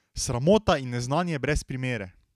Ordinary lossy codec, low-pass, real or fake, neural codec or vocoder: none; 14.4 kHz; real; none